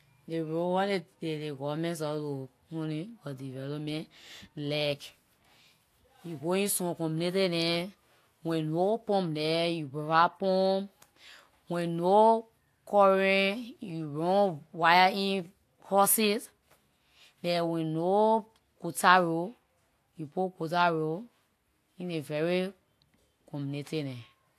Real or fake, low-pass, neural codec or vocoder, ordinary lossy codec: real; 14.4 kHz; none; AAC, 64 kbps